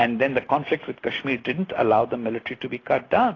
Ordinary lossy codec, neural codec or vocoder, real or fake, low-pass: AAC, 32 kbps; none; real; 7.2 kHz